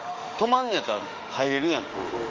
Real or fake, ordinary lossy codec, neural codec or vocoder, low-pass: fake; Opus, 32 kbps; autoencoder, 48 kHz, 32 numbers a frame, DAC-VAE, trained on Japanese speech; 7.2 kHz